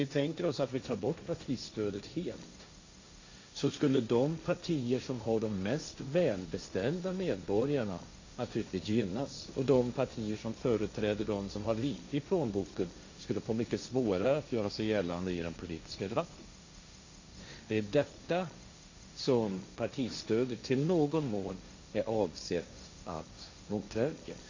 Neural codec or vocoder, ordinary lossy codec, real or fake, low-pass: codec, 16 kHz, 1.1 kbps, Voila-Tokenizer; AAC, 48 kbps; fake; 7.2 kHz